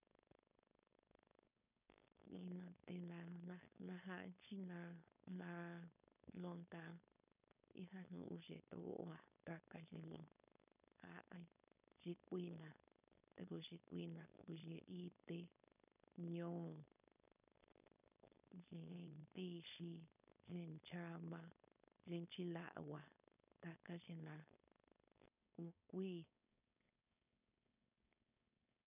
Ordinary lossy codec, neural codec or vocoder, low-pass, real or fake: none; codec, 16 kHz, 4.8 kbps, FACodec; 3.6 kHz; fake